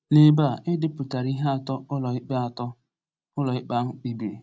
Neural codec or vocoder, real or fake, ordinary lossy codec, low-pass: none; real; none; none